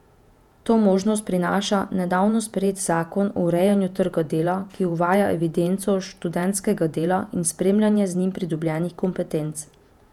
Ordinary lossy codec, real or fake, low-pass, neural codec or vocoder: none; fake; 19.8 kHz; vocoder, 48 kHz, 128 mel bands, Vocos